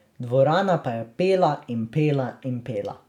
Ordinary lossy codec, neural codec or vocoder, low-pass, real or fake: none; none; 19.8 kHz; real